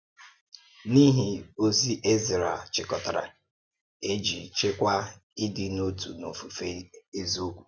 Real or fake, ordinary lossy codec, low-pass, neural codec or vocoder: real; none; none; none